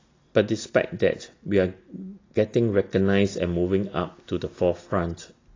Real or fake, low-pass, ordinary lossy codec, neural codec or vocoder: real; 7.2 kHz; AAC, 32 kbps; none